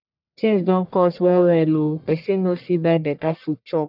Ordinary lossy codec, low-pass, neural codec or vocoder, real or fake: none; 5.4 kHz; codec, 44.1 kHz, 1.7 kbps, Pupu-Codec; fake